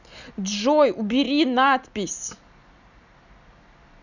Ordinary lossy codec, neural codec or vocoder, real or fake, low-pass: none; none; real; 7.2 kHz